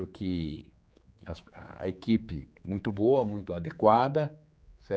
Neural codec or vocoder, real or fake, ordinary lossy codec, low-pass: codec, 16 kHz, 4 kbps, X-Codec, HuBERT features, trained on general audio; fake; none; none